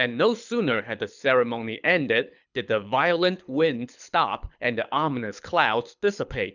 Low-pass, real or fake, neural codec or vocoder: 7.2 kHz; fake; codec, 24 kHz, 6 kbps, HILCodec